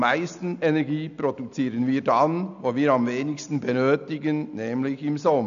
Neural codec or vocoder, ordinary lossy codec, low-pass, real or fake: none; none; 7.2 kHz; real